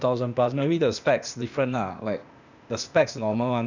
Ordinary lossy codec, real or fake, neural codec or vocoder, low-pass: none; fake; codec, 16 kHz, 0.8 kbps, ZipCodec; 7.2 kHz